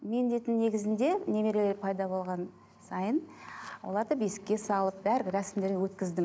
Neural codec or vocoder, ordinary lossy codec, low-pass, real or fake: none; none; none; real